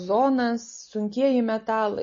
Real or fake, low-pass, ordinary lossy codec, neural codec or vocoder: real; 7.2 kHz; MP3, 32 kbps; none